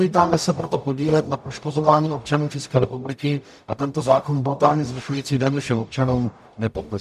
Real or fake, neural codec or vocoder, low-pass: fake; codec, 44.1 kHz, 0.9 kbps, DAC; 14.4 kHz